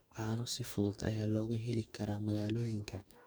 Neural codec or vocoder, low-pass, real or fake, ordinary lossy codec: codec, 44.1 kHz, 2.6 kbps, DAC; none; fake; none